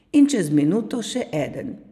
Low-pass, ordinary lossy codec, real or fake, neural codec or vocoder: 14.4 kHz; none; fake; vocoder, 44.1 kHz, 128 mel bands every 256 samples, BigVGAN v2